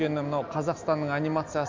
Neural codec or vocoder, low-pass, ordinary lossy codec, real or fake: none; 7.2 kHz; MP3, 64 kbps; real